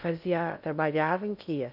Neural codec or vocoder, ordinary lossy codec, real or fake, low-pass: codec, 16 kHz in and 24 kHz out, 0.6 kbps, FocalCodec, streaming, 2048 codes; none; fake; 5.4 kHz